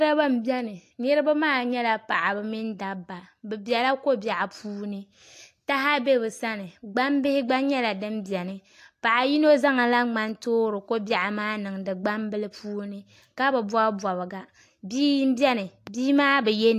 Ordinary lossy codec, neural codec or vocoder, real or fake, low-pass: AAC, 64 kbps; none; real; 14.4 kHz